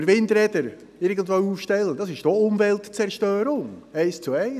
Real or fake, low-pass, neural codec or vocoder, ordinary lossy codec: real; 14.4 kHz; none; none